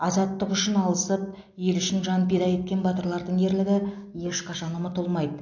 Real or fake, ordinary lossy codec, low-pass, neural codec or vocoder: real; AAC, 48 kbps; 7.2 kHz; none